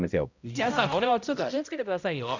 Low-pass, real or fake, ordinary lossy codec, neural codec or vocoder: 7.2 kHz; fake; none; codec, 16 kHz, 0.5 kbps, X-Codec, HuBERT features, trained on balanced general audio